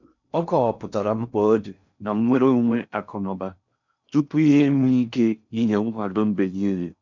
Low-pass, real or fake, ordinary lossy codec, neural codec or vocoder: 7.2 kHz; fake; none; codec, 16 kHz in and 24 kHz out, 0.6 kbps, FocalCodec, streaming, 4096 codes